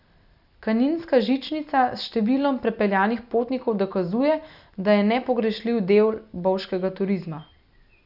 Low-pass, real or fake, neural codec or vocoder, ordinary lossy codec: 5.4 kHz; real; none; none